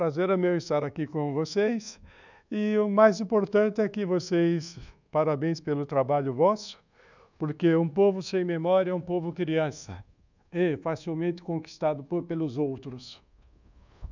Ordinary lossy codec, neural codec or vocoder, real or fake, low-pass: none; codec, 24 kHz, 1.2 kbps, DualCodec; fake; 7.2 kHz